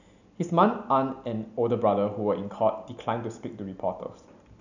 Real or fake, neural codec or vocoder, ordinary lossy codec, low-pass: real; none; none; 7.2 kHz